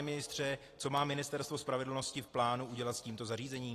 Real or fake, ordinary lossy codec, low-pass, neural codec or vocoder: fake; AAC, 48 kbps; 14.4 kHz; vocoder, 44.1 kHz, 128 mel bands every 256 samples, BigVGAN v2